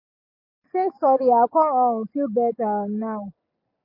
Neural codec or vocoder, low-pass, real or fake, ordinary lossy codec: none; 5.4 kHz; real; MP3, 32 kbps